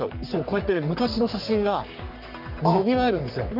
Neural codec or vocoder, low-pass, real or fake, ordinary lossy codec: codec, 44.1 kHz, 3.4 kbps, Pupu-Codec; 5.4 kHz; fake; none